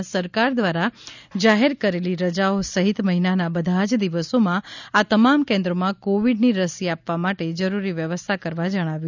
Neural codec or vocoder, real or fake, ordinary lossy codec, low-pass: none; real; none; 7.2 kHz